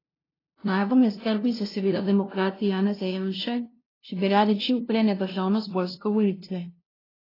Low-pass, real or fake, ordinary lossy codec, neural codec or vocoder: 5.4 kHz; fake; AAC, 24 kbps; codec, 16 kHz, 0.5 kbps, FunCodec, trained on LibriTTS, 25 frames a second